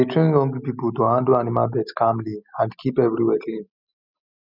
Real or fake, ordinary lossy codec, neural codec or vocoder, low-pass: real; none; none; 5.4 kHz